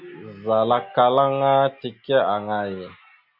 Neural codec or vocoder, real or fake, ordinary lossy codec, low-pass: none; real; AAC, 48 kbps; 5.4 kHz